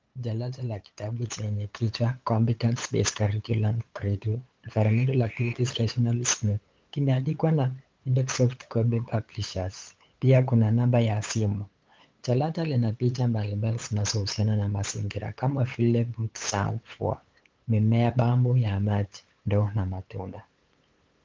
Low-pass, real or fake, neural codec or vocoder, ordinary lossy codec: 7.2 kHz; fake; codec, 16 kHz, 8 kbps, FunCodec, trained on LibriTTS, 25 frames a second; Opus, 32 kbps